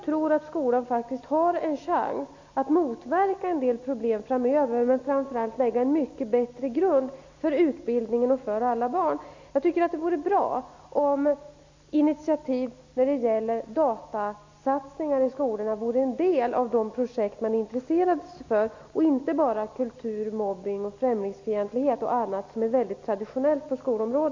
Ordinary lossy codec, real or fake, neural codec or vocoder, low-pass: MP3, 64 kbps; real; none; 7.2 kHz